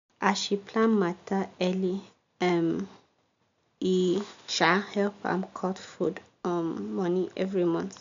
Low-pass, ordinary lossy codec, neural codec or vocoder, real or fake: 7.2 kHz; MP3, 64 kbps; none; real